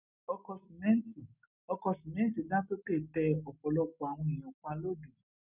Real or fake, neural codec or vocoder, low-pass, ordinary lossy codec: real; none; 3.6 kHz; none